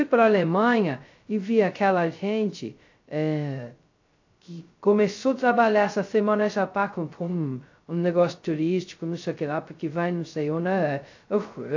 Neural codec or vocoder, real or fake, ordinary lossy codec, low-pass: codec, 16 kHz, 0.2 kbps, FocalCodec; fake; AAC, 48 kbps; 7.2 kHz